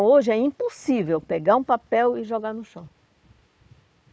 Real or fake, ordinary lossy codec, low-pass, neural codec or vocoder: fake; none; none; codec, 16 kHz, 4 kbps, FunCodec, trained on Chinese and English, 50 frames a second